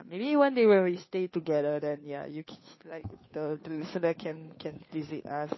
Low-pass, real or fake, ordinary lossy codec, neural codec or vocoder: 7.2 kHz; fake; MP3, 24 kbps; codec, 16 kHz, 2 kbps, FunCodec, trained on Chinese and English, 25 frames a second